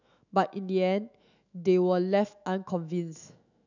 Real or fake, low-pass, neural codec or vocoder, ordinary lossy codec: fake; 7.2 kHz; autoencoder, 48 kHz, 128 numbers a frame, DAC-VAE, trained on Japanese speech; none